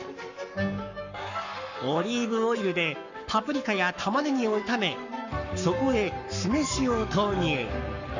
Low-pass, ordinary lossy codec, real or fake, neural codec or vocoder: 7.2 kHz; none; fake; codec, 44.1 kHz, 7.8 kbps, Pupu-Codec